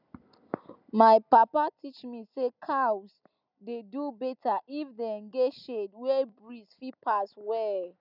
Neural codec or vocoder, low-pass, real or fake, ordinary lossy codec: none; 5.4 kHz; real; none